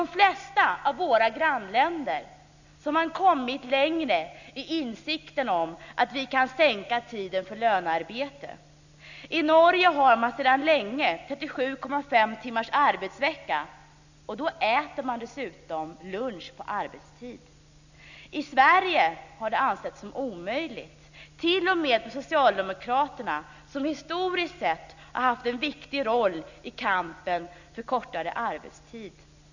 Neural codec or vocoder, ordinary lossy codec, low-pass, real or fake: none; none; 7.2 kHz; real